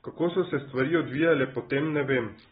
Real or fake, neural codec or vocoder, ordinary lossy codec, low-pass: real; none; AAC, 16 kbps; 19.8 kHz